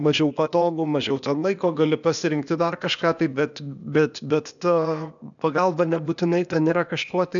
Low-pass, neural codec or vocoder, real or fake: 7.2 kHz; codec, 16 kHz, 0.8 kbps, ZipCodec; fake